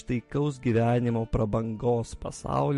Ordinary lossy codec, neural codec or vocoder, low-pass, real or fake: MP3, 48 kbps; none; 14.4 kHz; real